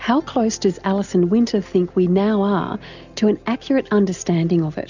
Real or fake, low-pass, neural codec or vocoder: real; 7.2 kHz; none